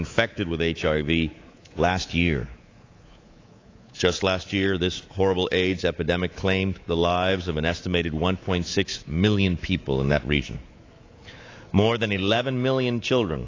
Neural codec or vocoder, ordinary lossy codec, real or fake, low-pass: codec, 24 kHz, 3.1 kbps, DualCodec; AAC, 32 kbps; fake; 7.2 kHz